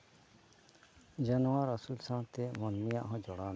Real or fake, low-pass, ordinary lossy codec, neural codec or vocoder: real; none; none; none